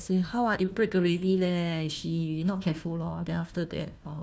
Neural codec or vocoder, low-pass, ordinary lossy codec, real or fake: codec, 16 kHz, 1 kbps, FunCodec, trained on Chinese and English, 50 frames a second; none; none; fake